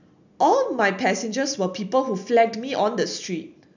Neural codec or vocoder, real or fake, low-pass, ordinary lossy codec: none; real; 7.2 kHz; none